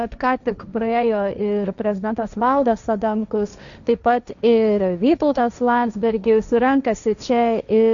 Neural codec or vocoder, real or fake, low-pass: codec, 16 kHz, 1.1 kbps, Voila-Tokenizer; fake; 7.2 kHz